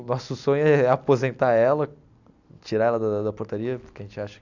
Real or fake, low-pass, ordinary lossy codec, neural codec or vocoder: real; 7.2 kHz; none; none